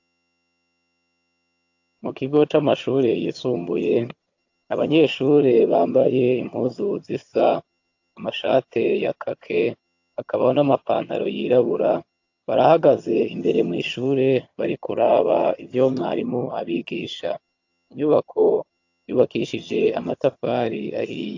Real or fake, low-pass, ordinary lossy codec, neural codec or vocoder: fake; 7.2 kHz; AAC, 48 kbps; vocoder, 22.05 kHz, 80 mel bands, HiFi-GAN